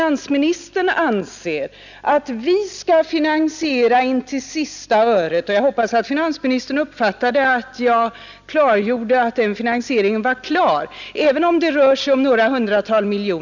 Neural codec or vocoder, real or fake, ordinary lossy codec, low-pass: none; real; none; 7.2 kHz